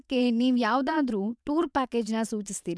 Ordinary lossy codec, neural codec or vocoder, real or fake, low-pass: none; vocoder, 22.05 kHz, 80 mel bands, WaveNeXt; fake; none